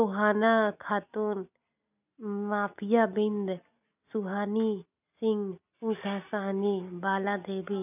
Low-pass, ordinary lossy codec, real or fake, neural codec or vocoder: 3.6 kHz; none; real; none